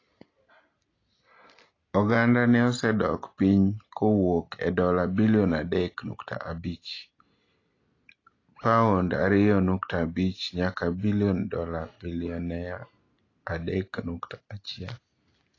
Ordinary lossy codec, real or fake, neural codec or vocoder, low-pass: AAC, 32 kbps; real; none; 7.2 kHz